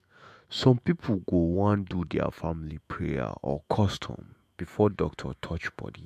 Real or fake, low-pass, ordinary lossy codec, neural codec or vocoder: fake; 14.4 kHz; MP3, 64 kbps; autoencoder, 48 kHz, 128 numbers a frame, DAC-VAE, trained on Japanese speech